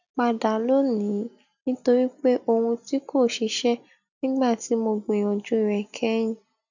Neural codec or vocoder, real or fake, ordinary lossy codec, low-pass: none; real; none; 7.2 kHz